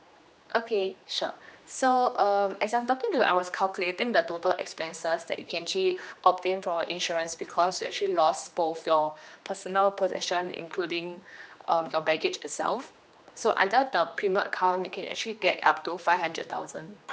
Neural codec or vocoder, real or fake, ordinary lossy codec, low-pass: codec, 16 kHz, 2 kbps, X-Codec, HuBERT features, trained on general audio; fake; none; none